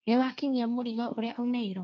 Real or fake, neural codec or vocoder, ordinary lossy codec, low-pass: fake; codec, 16 kHz, 1.1 kbps, Voila-Tokenizer; none; none